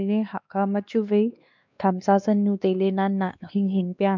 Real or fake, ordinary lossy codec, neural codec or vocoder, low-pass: fake; none; codec, 16 kHz, 2 kbps, X-Codec, WavLM features, trained on Multilingual LibriSpeech; 7.2 kHz